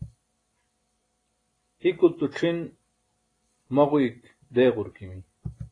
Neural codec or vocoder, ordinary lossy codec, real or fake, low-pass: none; AAC, 32 kbps; real; 9.9 kHz